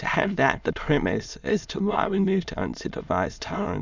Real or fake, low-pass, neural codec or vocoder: fake; 7.2 kHz; autoencoder, 22.05 kHz, a latent of 192 numbers a frame, VITS, trained on many speakers